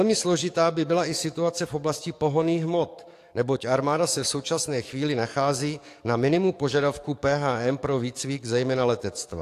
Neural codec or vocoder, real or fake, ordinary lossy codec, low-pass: codec, 44.1 kHz, 7.8 kbps, DAC; fake; AAC, 64 kbps; 14.4 kHz